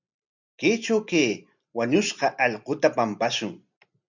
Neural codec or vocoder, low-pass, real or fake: none; 7.2 kHz; real